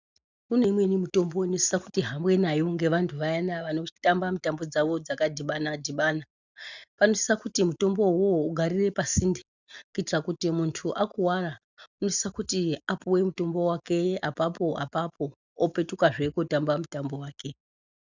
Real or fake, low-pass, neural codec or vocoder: real; 7.2 kHz; none